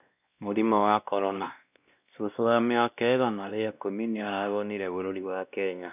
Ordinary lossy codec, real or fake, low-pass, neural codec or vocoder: none; fake; 3.6 kHz; codec, 16 kHz, 1 kbps, X-Codec, WavLM features, trained on Multilingual LibriSpeech